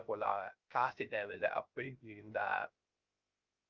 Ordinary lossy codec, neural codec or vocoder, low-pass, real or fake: Opus, 24 kbps; codec, 16 kHz, 0.3 kbps, FocalCodec; 7.2 kHz; fake